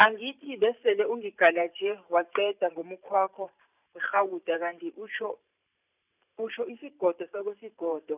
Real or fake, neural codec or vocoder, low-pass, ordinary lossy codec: fake; vocoder, 44.1 kHz, 128 mel bands every 512 samples, BigVGAN v2; 3.6 kHz; none